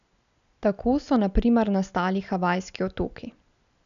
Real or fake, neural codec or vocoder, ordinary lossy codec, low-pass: real; none; none; 7.2 kHz